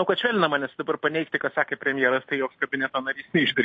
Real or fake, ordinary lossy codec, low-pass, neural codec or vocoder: real; MP3, 32 kbps; 9.9 kHz; none